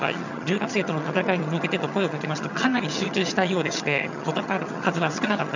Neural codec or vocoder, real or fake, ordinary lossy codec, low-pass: vocoder, 22.05 kHz, 80 mel bands, HiFi-GAN; fake; none; 7.2 kHz